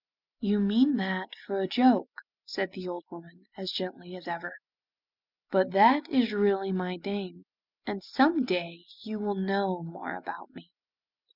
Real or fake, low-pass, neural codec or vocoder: real; 5.4 kHz; none